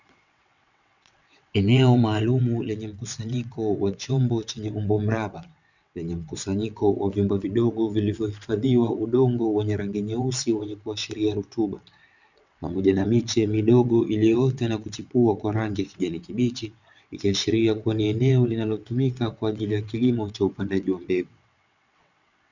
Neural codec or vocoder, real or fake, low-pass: vocoder, 22.05 kHz, 80 mel bands, WaveNeXt; fake; 7.2 kHz